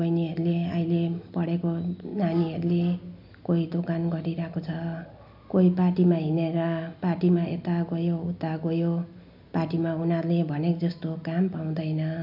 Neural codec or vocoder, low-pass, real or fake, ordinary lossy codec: none; 5.4 kHz; real; none